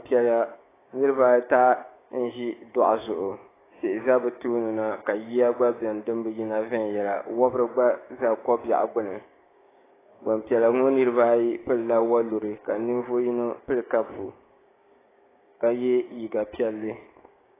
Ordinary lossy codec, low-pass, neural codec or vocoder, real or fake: AAC, 16 kbps; 3.6 kHz; codec, 16 kHz, 6 kbps, DAC; fake